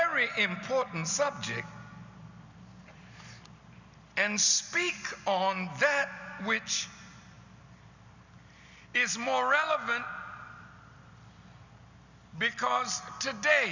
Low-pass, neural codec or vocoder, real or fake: 7.2 kHz; none; real